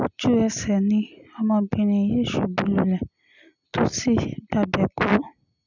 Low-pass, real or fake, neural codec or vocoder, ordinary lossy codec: 7.2 kHz; real; none; none